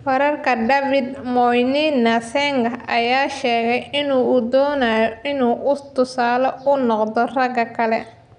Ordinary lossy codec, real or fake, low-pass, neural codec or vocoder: none; real; 10.8 kHz; none